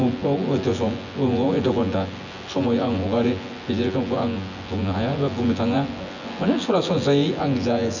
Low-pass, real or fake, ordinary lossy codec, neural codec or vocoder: 7.2 kHz; fake; none; vocoder, 24 kHz, 100 mel bands, Vocos